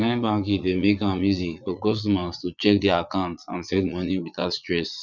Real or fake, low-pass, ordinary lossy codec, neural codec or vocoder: fake; 7.2 kHz; none; vocoder, 22.05 kHz, 80 mel bands, Vocos